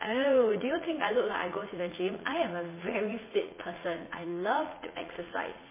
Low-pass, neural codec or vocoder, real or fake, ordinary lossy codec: 3.6 kHz; vocoder, 44.1 kHz, 80 mel bands, Vocos; fake; MP3, 16 kbps